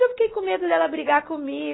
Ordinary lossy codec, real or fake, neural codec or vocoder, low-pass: AAC, 16 kbps; real; none; 7.2 kHz